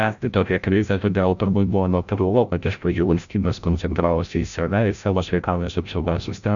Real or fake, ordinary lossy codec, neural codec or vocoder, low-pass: fake; MP3, 96 kbps; codec, 16 kHz, 0.5 kbps, FreqCodec, larger model; 7.2 kHz